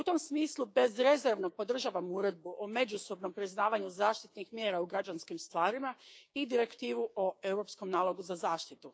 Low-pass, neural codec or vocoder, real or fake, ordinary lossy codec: none; codec, 16 kHz, 6 kbps, DAC; fake; none